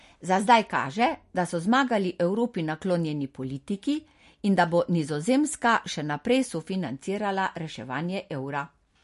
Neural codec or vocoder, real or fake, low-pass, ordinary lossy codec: none; real; 14.4 kHz; MP3, 48 kbps